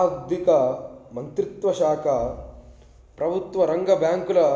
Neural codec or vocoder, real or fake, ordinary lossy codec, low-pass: none; real; none; none